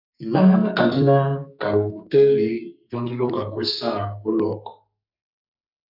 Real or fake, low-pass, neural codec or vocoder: fake; 5.4 kHz; codec, 44.1 kHz, 2.6 kbps, SNAC